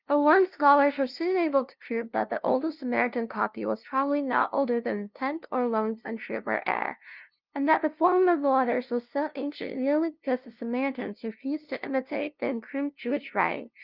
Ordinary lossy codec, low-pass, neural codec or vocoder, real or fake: Opus, 32 kbps; 5.4 kHz; codec, 16 kHz, 0.5 kbps, FunCodec, trained on LibriTTS, 25 frames a second; fake